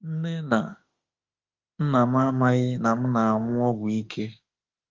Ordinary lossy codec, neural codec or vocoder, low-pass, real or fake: Opus, 32 kbps; autoencoder, 48 kHz, 32 numbers a frame, DAC-VAE, trained on Japanese speech; 7.2 kHz; fake